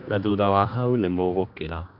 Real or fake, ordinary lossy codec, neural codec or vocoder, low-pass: fake; none; codec, 16 kHz, 2 kbps, X-Codec, HuBERT features, trained on general audio; 5.4 kHz